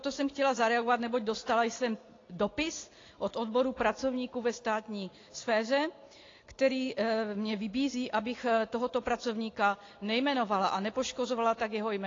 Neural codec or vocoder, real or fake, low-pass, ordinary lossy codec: none; real; 7.2 kHz; AAC, 32 kbps